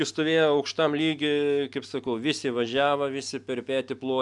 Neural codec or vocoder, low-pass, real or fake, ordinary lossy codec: none; 10.8 kHz; real; MP3, 96 kbps